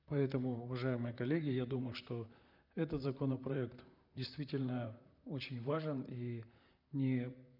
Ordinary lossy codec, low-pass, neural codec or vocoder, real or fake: none; 5.4 kHz; vocoder, 44.1 kHz, 128 mel bands, Pupu-Vocoder; fake